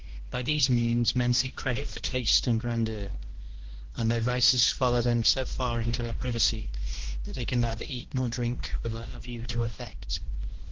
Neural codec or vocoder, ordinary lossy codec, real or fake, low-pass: codec, 16 kHz, 1 kbps, X-Codec, HuBERT features, trained on balanced general audio; Opus, 16 kbps; fake; 7.2 kHz